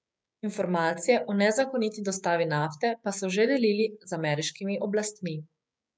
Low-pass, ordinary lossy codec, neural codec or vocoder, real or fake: none; none; codec, 16 kHz, 6 kbps, DAC; fake